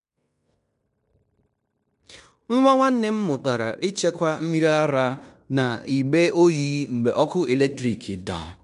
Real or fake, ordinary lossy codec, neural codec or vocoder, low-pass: fake; none; codec, 16 kHz in and 24 kHz out, 0.9 kbps, LongCat-Audio-Codec, fine tuned four codebook decoder; 10.8 kHz